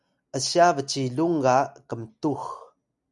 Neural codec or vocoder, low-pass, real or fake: none; 10.8 kHz; real